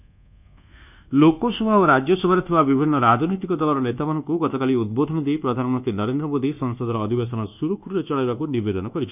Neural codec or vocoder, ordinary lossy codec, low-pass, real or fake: codec, 24 kHz, 1.2 kbps, DualCodec; none; 3.6 kHz; fake